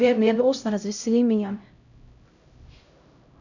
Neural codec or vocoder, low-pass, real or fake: codec, 16 kHz, 0.5 kbps, X-Codec, HuBERT features, trained on LibriSpeech; 7.2 kHz; fake